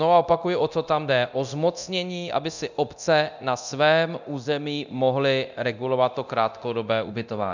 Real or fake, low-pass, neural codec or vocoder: fake; 7.2 kHz; codec, 24 kHz, 0.9 kbps, DualCodec